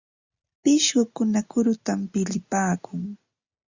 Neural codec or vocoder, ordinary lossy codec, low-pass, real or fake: none; Opus, 64 kbps; 7.2 kHz; real